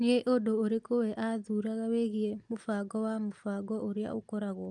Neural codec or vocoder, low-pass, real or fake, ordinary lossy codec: none; 10.8 kHz; real; Opus, 32 kbps